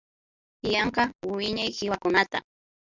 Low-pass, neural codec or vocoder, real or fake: 7.2 kHz; none; real